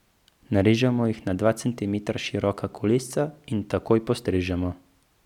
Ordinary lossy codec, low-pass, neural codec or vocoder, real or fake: none; 19.8 kHz; none; real